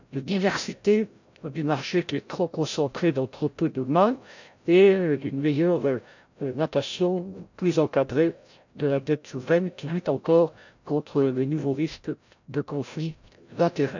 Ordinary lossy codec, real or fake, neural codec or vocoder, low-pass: none; fake; codec, 16 kHz, 0.5 kbps, FreqCodec, larger model; 7.2 kHz